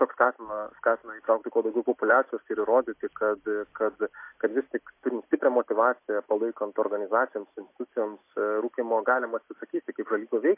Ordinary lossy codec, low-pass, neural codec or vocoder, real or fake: MP3, 24 kbps; 3.6 kHz; none; real